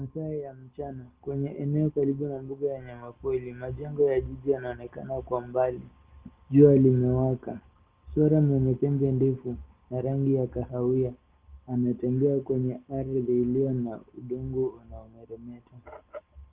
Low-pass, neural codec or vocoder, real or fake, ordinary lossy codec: 3.6 kHz; none; real; Opus, 16 kbps